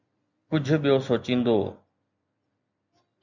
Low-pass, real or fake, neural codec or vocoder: 7.2 kHz; real; none